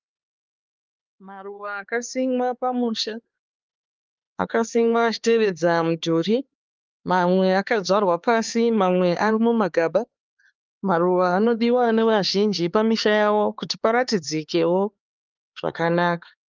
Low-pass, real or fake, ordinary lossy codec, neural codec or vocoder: 7.2 kHz; fake; Opus, 32 kbps; codec, 16 kHz, 4 kbps, X-Codec, HuBERT features, trained on LibriSpeech